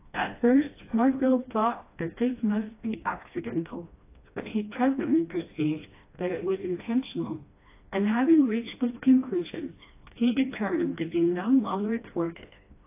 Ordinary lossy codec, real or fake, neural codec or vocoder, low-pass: AAC, 24 kbps; fake; codec, 16 kHz, 1 kbps, FreqCodec, smaller model; 3.6 kHz